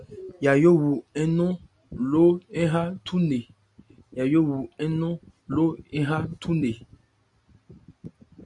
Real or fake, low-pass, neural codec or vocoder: real; 10.8 kHz; none